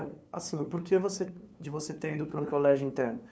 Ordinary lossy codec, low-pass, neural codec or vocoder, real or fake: none; none; codec, 16 kHz, 2 kbps, FunCodec, trained on LibriTTS, 25 frames a second; fake